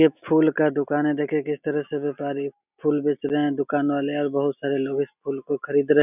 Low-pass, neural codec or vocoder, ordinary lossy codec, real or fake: 3.6 kHz; none; none; real